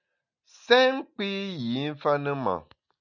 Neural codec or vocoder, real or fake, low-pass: none; real; 7.2 kHz